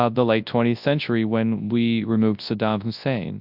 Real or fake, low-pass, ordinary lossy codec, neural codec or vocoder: fake; 5.4 kHz; AAC, 48 kbps; codec, 24 kHz, 0.9 kbps, WavTokenizer, large speech release